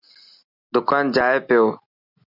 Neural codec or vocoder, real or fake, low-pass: none; real; 5.4 kHz